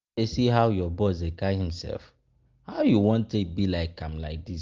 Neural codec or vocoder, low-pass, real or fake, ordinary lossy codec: none; 7.2 kHz; real; Opus, 32 kbps